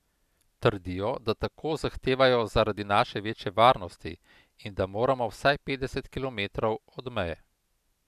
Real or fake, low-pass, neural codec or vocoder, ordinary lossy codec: real; 14.4 kHz; none; none